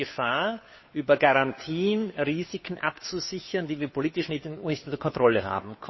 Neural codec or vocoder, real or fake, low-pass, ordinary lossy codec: codec, 16 kHz, 2 kbps, FunCodec, trained on Chinese and English, 25 frames a second; fake; 7.2 kHz; MP3, 24 kbps